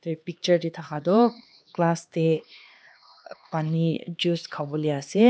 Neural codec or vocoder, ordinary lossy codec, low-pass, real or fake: codec, 16 kHz, 2 kbps, X-Codec, HuBERT features, trained on LibriSpeech; none; none; fake